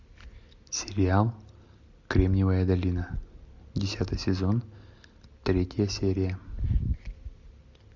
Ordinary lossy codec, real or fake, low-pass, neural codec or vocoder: MP3, 64 kbps; real; 7.2 kHz; none